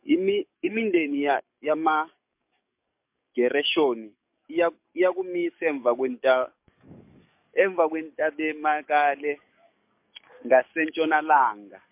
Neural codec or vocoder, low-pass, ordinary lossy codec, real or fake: codec, 44.1 kHz, 7.8 kbps, DAC; 3.6 kHz; MP3, 32 kbps; fake